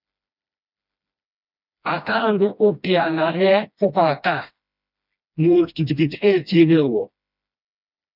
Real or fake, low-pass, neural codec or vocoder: fake; 5.4 kHz; codec, 16 kHz, 1 kbps, FreqCodec, smaller model